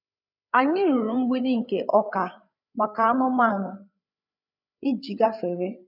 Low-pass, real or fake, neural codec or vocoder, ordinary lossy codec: 5.4 kHz; fake; codec, 16 kHz, 8 kbps, FreqCodec, larger model; none